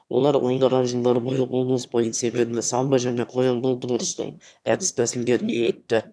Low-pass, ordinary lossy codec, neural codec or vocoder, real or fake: none; none; autoencoder, 22.05 kHz, a latent of 192 numbers a frame, VITS, trained on one speaker; fake